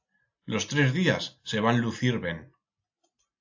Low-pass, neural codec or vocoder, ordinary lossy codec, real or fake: 7.2 kHz; none; AAC, 48 kbps; real